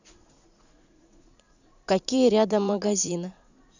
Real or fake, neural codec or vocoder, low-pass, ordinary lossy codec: fake; vocoder, 44.1 kHz, 80 mel bands, Vocos; 7.2 kHz; none